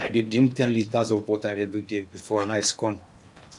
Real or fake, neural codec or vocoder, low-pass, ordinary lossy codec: fake; codec, 16 kHz in and 24 kHz out, 0.8 kbps, FocalCodec, streaming, 65536 codes; 10.8 kHz; none